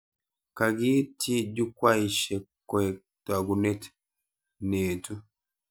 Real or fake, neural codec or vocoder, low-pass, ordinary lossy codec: real; none; none; none